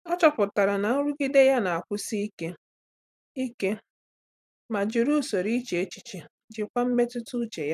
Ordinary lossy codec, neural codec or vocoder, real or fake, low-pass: none; vocoder, 44.1 kHz, 128 mel bands every 256 samples, BigVGAN v2; fake; 14.4 kHz